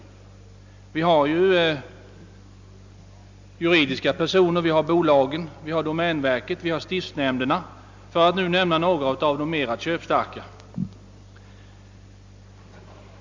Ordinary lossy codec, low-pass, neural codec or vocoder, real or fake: MP3, 64 kbps; 7.2 kHz; none; real